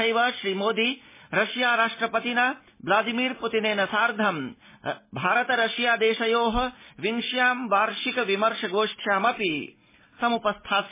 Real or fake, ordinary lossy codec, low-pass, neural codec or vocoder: real; MP3, 16 kbps; 3.6 kHz; none